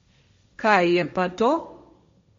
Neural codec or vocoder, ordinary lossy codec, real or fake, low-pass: codec, 16 kHz, 1.1 kbps, Voila-Tokenizer; MP3, 48 kbps; fake; 7.2 kHz